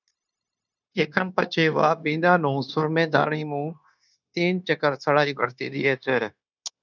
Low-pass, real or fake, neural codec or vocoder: 7.2 kHz; fake; codec, 16 kHz, 0.9 kbps, LongCat-Audio-Codec